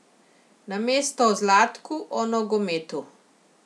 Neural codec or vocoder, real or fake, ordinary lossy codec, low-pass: none; real; none; none